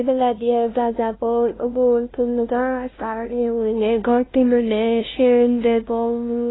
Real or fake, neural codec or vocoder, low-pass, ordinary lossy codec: fake; codec, 16 kHz, 0.5 kbps, FunCodec, trained on LibriTTS, 25 frames a second; 7.2 kHz; AAC, 16 kbps